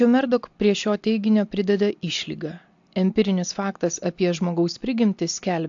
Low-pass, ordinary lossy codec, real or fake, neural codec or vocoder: 7.2 kHz; AAC, 64 kbps; real; none